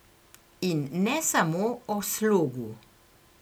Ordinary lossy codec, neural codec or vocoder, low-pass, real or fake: none; none; none; real